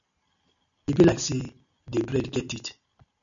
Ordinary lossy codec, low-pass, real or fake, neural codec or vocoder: MP3, 96 kbps; 7.2 kHz; real; none